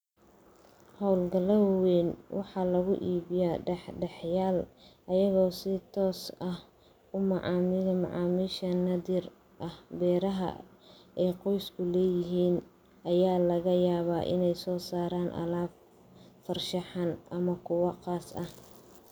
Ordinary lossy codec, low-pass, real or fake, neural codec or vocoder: none; none; real; none